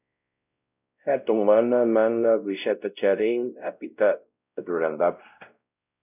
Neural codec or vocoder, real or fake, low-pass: codec, 16 kHz, 0.5 kbps, X-Codec, WavLM features, trained on Multilingual LibriSpeech; fake; 3.6 kHz